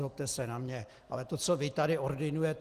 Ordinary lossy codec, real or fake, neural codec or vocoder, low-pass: Opus, 24 kbps; real; none; 14.4 kHz